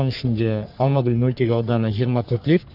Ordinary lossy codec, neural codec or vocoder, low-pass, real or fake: none; codec, 44.1 kHz, 3.4 kbps, Pupu-Codec; 5.4 kHz; fake